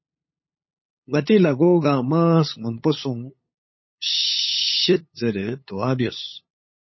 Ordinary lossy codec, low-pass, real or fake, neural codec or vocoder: MP3, 24 kbps; 7.2 kHz; fake; codec, 16 kHz, 8 kbps, FunCodec, trained on LibriTTS, 25 frames a second